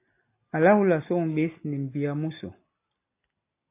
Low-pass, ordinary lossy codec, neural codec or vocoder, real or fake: 3.6 kHz; AAC, 24 kbps; none; real